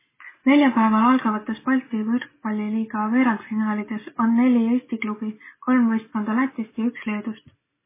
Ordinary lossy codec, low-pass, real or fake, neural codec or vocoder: MP3, 16 kbps; 3.6 kHz; real; none